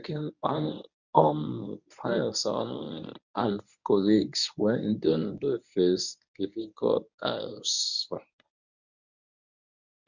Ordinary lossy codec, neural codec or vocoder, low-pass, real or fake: none; codec, 24 kHz, 0.9 kbps, WavTokenizer, medium speech release version 1; 7.2 kHz; fake